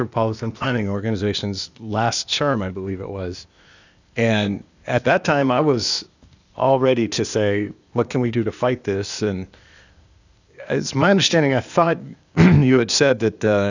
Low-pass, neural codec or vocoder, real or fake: 7.2 kHz; codec, 16 kHz, 0.8 kbps, ZipCodec; fake